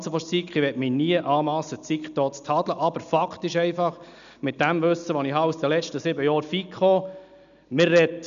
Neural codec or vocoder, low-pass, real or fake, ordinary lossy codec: none; 7.2 kHz; real; none